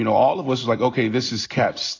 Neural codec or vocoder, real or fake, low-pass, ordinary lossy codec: none; real; 7.2 kHz; AAC, 32 kbps